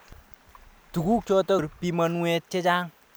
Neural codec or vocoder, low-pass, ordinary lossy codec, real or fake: none; none; none; real